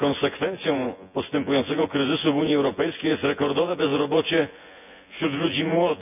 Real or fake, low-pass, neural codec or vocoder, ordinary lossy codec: fake; 3.6 kHz; vocoder, 24 kHz, 100 mel bands, Vocos; none